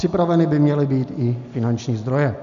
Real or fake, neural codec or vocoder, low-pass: real; none; 7.2 kHz